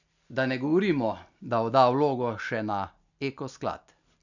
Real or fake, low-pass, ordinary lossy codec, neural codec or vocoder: real; 7.2 kHz; none; none